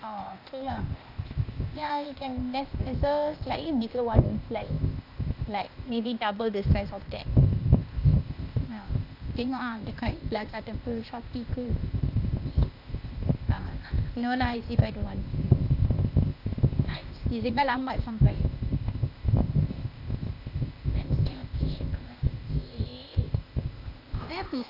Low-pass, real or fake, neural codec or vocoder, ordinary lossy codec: 5.4 kHz; fake; codec, 16 kHz, 0.8 kbps, ZipCodec; none